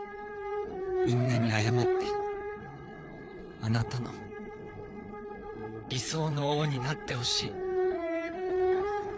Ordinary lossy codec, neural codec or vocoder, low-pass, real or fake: none; codec, 16 kHz, 4 kbps, FreqCodec, larger model; none; fake